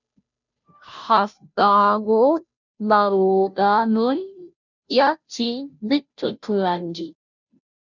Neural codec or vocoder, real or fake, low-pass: codec, 16 kHz, 0.5 kbps, FunCodec, trained on Chinese and English, 25 frames a second; fake; 7.2 kHz